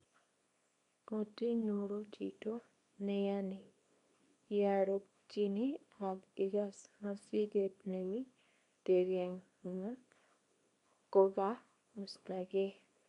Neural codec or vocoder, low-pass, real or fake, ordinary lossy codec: codec, 24 kHz, 0.9 kbps, WavTokenizer, small release; 10.8 kHz; fake; none